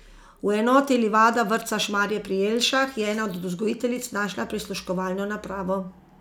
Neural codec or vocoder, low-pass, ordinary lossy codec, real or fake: none; 19.8 kHz; none; real